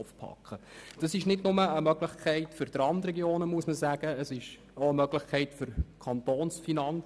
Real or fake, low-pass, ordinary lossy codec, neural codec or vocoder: real; none; none; none